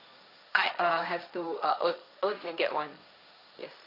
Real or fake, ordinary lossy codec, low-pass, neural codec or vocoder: fake; none; 5.4 kHz; codec, 16 kHz, 1.1 kbps, Voila-Tokenizer